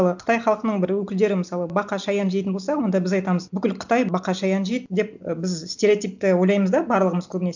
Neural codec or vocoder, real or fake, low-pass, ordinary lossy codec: none; real; 7.2 kHz; none